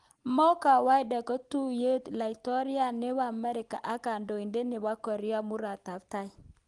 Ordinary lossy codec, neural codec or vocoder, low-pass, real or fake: Opus, 24 kbps; none; 10.8 kHz; real